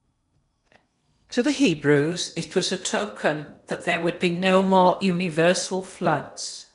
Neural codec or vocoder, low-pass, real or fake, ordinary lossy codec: codec, 16 kHz in and 24 kHz out, 0.8 kbps, FocalCodec, streaming, 65536 codes; 10.8 kHz; fake; none